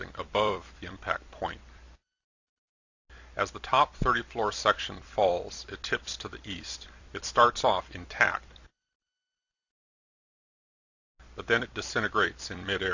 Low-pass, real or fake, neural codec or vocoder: 7.2 kHz; fake; vocoder, 44.1 kHz, 128 mel bands every 256 samples, BigVGAN v2